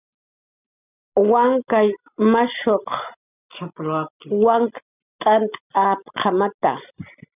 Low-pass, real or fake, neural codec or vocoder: 3.6 kHz; real; none